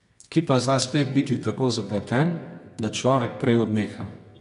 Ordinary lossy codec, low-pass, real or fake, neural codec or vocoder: none; 10.8 kHz; fake; codec, 24 kHz, 0.9 kbps, WavTokenizer, medium music audio release